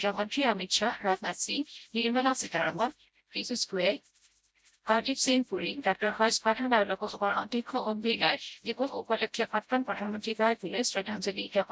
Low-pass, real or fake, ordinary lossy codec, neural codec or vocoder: none; fake; none; codec, 16 kHz, 0.5 kbps, FreqCodec, smaller model